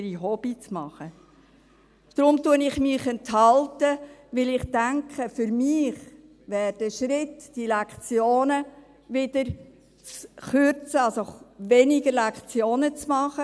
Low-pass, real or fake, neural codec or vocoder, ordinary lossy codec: none; real; none; none